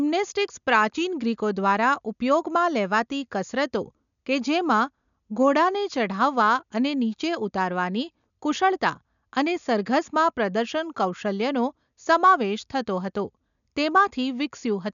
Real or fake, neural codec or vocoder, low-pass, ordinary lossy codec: real; none; 7.2 kHz; none